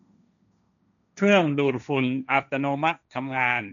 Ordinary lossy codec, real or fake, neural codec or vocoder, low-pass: none; fake; codec, 16 kHz, 1.1 kbps, Voila-Tokenizer; 7.2 kHz